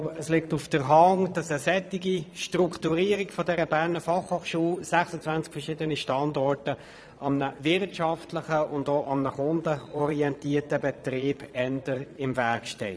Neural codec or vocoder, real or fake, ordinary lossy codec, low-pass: vocoder, 22.05 kHz, 80 mel bands, Vocos; fake; none; none